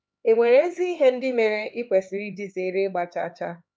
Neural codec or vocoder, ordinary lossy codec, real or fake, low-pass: codec, 16 kHz, 4 kbps, X-Codec, HuBERT features, trained on LibriSpeech; none; fake; none